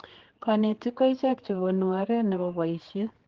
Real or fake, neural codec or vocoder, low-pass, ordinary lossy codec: fake; codec, 16 kHz, 4 kbps, X-Codec, HuBERT features, trained on general audio; 7.2 kHz; Opus, 16 kbps